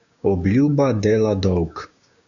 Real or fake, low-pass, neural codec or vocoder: fake; 7.2 kHz; codec, 16 kHz, 6 kbps, DAC